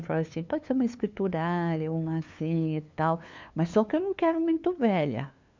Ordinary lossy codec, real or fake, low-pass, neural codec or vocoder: MP3, 64 kbps; fake; 7.2 kHz; codec, 16 kHz, 2 kbps, FunCodec, trained on LibriTTS, 25 frames a second